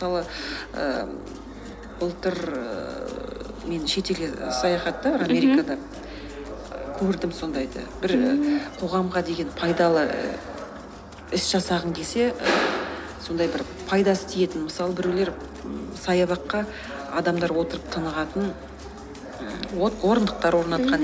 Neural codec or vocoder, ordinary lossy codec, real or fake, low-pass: none; none; real; none